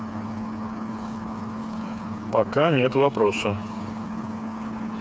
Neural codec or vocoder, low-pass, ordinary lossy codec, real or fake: codec, 16 kHz, 4 kbps, FreqCodec, smaller model; none; none; fake